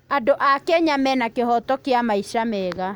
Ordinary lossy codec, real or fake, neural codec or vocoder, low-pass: none; real; none; none